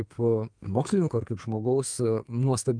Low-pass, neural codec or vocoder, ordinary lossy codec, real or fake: 9.9 kHz; codec, 32 kHz, 1.9 kbps, SNAC; Opus, 32 kbps; fake